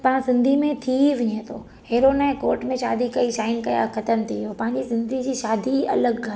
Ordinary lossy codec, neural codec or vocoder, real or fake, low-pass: none; none; real; none